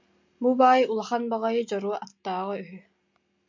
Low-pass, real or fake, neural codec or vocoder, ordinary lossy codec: 7.2 kHz; real; none; MP3, 64 kbps